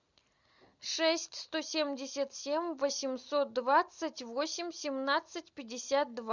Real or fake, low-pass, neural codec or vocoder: real; 7.2 kHz; none